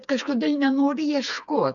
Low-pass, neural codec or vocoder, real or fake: 7.2 kHz; codec, 16 kHz, 4 kbps, FreqCodec, smaller model; fake